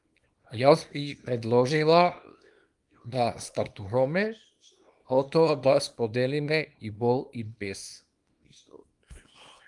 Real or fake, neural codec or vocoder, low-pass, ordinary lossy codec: fake; codec, 24 kHz, 0.9 kbps, WavTokenizer, small release; 10.8 kHz; Opus, 32 kbps